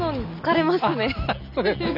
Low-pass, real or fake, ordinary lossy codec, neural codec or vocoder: 5.4 kHz; real; none; none